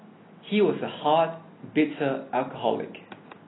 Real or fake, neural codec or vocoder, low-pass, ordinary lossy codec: real; none; 7.2 kHz; AAC, 16 kbps